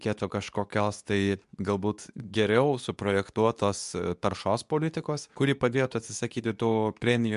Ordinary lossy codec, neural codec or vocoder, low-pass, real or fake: MP3, 96 kbps; codec, 24 kHz, 0.9 kbps, WavTokenizer, medium speech release version 2; 10.8 kHz; fake